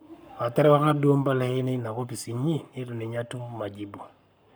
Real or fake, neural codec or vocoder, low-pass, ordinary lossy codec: fake; codec, 44.1 kHz, 7.8 kbps, Pupu-Codec; none; none